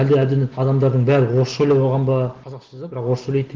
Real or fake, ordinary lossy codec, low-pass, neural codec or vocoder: real; Opus, 16 kbps; 7.2 kHz; none